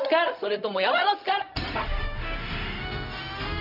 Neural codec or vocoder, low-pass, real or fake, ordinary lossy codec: codec, 16 kHz, 0.4 kbps, LongCat-Audio-Codec; 5.4 kHz; fake; none